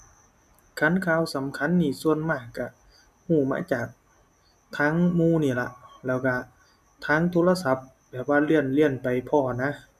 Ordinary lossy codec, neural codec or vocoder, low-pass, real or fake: none; none; 14.4 kHz; real